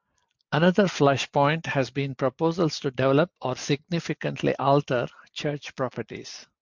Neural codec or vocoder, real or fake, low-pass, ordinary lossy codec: none; real; 7.2 kHz; MP3, 64 kbps